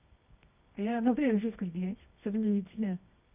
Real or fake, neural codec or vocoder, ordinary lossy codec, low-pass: fake; codec, 24 kHz, 0.9 kbps, WavTokenizer, medium music audio release; none; 3.6 kHz